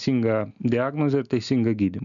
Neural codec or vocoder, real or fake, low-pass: none; real; 7.2 kHz